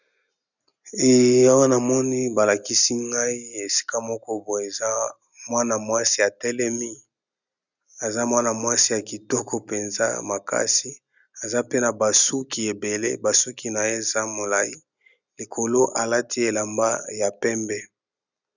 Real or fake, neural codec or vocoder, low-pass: real; none; 7.2 kHz